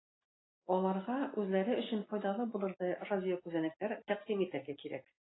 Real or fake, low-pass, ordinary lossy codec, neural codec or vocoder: real; 7.2 kHz; AAC, 16 kbps; none